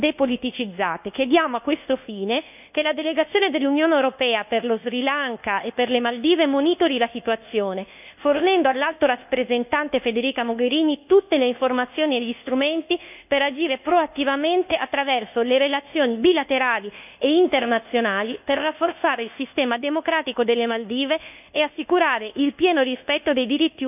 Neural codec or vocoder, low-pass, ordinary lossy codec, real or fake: codec, 24 kHz, 1.2 kbps, DualCodec; 3.6 kHz; none; fake